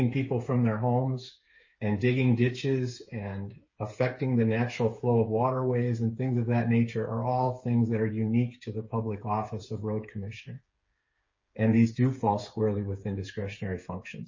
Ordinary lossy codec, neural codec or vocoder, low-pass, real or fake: MP3, 32 kbps; codec, 16 kHz, 8 kbps, FreqCodec, smaller model; 7.2 kHz; fake